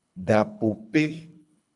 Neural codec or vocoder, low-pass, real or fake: codec, 24 kHz, 3 kbps, HILCodec; 10.8 kHz; fake